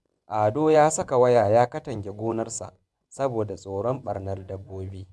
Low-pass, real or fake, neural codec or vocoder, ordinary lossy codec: none; fake; vocoder, 24 kHz, 100 mel bands, Vocos; none